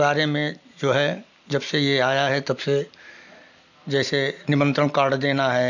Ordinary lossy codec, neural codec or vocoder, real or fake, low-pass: none; none; real; 7.2 kHz